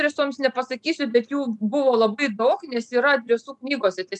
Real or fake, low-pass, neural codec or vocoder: real; 10.8 kHz; none